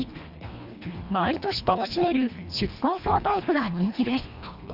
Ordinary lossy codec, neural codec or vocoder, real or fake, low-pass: none; codec, 24 kHz, 1.5 kbps, HILCodec; fake; 5.4 kHz